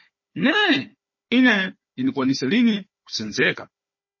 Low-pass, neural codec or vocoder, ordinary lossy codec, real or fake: 7.2 kHz; codec, 16 kHz, 4 kbps, FunCodec, trained on Chinese and English, 50 frames a second; MP3, 32 kbps; fake